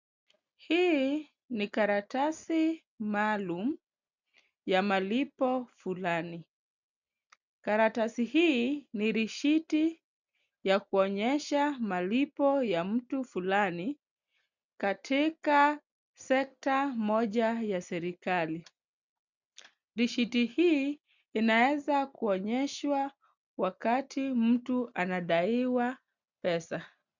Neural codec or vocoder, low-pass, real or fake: none; 7.2 kHz; real